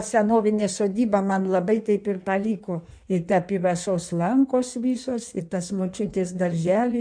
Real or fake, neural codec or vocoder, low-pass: fake; codec, 16 kHz in and 24 kHz out, 1.1 kbps, FireRedTTS-2 codec; 9.9 kHz